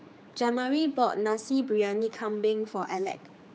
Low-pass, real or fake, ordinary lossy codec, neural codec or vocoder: none; fake; none; codec, 16 kHz, 4 kbps, X-Codec, HuBERT features, trained on general audio